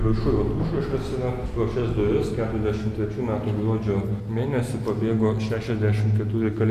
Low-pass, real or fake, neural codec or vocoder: 14.4 kHz; real; none